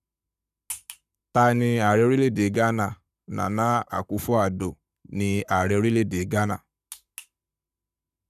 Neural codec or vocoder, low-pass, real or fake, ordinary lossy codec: codec, 44.1 kHz, 7.8 kbps, Pupu-Codec; 14.4 kHz; fake; none